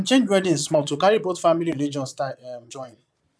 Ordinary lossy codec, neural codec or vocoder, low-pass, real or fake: none; vocoder, 22.05 kHz, 80 mel bands, Vocos; none; fake